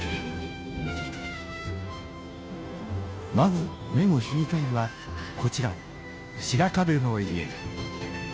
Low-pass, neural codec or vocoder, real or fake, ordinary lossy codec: none; codec, 16 kHz, 0.5 kbps, FunCodec, trained on Chinese and English, 25 frames a second; fake; none